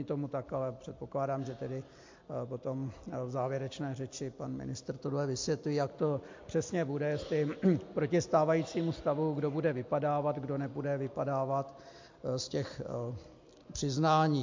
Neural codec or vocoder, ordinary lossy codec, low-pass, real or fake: none; MP3, 48 kbps; 7.2 kHz; real